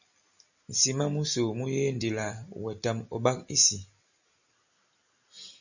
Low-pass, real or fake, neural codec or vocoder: 7.2 kHz; real; none